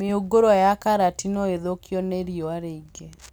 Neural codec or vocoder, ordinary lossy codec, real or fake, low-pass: none; none; real; none